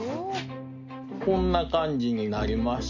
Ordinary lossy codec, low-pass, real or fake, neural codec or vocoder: none; 7.2 kHz; real; none